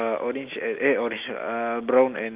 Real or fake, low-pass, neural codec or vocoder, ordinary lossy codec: real; 3.6 kHz; none; Opus, 32 kbps